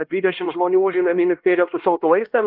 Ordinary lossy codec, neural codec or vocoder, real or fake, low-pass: Opus, 32 kbps; codec, 16 kHz, 1 kbps, X-Codec, HuBERT features, trained on LibriSpeech; fake; 5.4 kHz